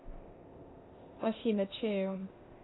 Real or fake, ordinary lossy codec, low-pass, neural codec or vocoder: fake; AAC, 16 kbps; 7.2 kHz; codec, 16 kHz, 0.8 kbps, ZipCodec